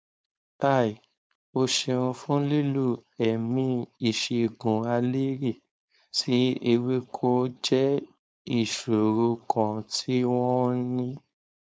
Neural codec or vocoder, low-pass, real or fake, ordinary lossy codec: codec, 16 kHz, 4.8 kbps, FACodec; none; fake; none